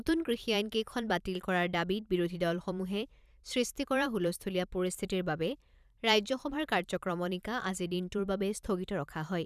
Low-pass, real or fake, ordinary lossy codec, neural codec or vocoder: 14.4 kHz; fake; none; vocoder, 44.1 kHz, 128 mel bands every 512 samples, BigVGAN v2